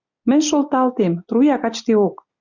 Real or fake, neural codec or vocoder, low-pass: real; none; 7.2 kHz